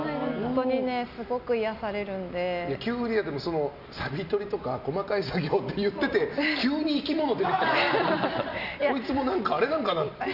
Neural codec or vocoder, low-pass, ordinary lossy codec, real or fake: none; 5.4 kHz; none; real